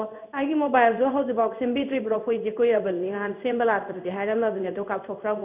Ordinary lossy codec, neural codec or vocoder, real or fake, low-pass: none; codec, 16 kHz in and 24 kHz out, 1 kbps, XY-Tokenizer; fake; 3.6 kHz